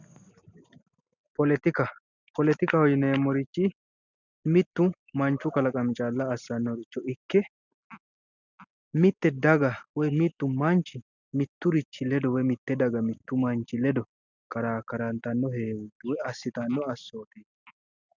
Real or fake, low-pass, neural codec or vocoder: real; 7.2 kHz; none